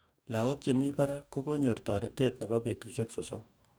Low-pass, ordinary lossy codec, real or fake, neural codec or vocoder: none; none; fake; codec, 44.1 kHz, 2.6 kbps, DAC